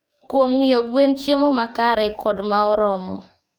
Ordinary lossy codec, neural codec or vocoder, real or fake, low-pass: none; codec, 44.1 kHz, 2.6 kbps, DAC; fake; none